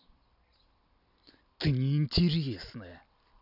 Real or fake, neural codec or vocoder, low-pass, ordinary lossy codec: real; none; 5.4 kHz; none